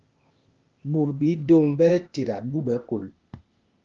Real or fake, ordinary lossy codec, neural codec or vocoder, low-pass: fake; Opus, 32 kbps; codec, 16 kHz, 0.8 kbps, ZipCodec; 7.2 kHz